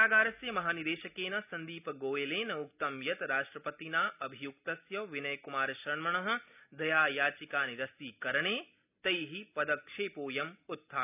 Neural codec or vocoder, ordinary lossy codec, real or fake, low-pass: none; none; real; 3.6 kHz